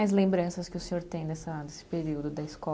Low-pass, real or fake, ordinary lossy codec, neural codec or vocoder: none; real; none; none